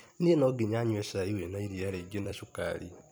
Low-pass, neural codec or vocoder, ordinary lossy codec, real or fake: none; vocoder, 44.1 kHz, 128 mel bands every 256 samples, BigVGAN v2; none; fake